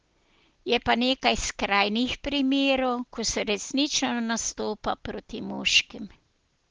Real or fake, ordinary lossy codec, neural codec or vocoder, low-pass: real; Opus, 16 kbps; none; 7.2 kHz